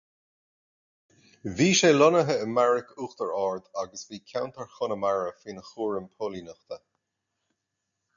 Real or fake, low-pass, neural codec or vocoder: real; 7.2 kHz; none